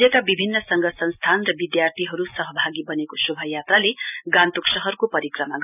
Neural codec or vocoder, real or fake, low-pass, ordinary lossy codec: none; real; 3.6 kHz; none